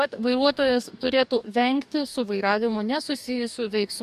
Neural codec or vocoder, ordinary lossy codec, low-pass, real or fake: codec, 44.1 kHz, 2.6 kbps, DAC; AAC, 96 kbps; 14.4 kHz; fake